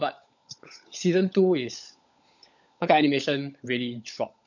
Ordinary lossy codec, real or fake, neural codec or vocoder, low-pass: none; fake; codec, 16 kHz, 16 kbps, FunCodec, trained on LibriTTS, 50 frames a second; 7.2 kHz